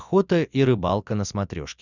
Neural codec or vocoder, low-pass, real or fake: none; 7.2 kHz; real